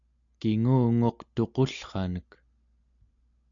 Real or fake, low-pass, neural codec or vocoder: real; 7.2 kHz; none